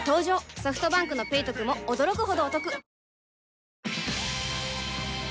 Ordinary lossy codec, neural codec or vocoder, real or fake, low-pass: none; none; real; none